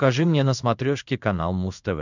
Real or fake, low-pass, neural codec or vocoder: fake; 7.2 kHz; codec, 16 kHz in and 24 kHz out, 1 kbps, XY-Tokenizer